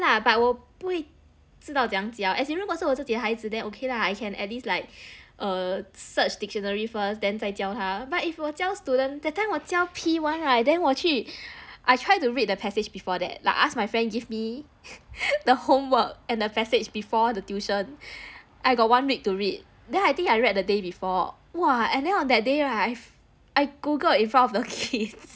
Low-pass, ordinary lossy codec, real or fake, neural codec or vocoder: none; none; real; none